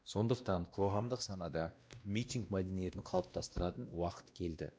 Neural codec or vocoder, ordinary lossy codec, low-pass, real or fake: codec, 16 kHz, 1 kbps, X-Codec, WavLM features, trained on Multilingual LibriSpeech; none; none; fake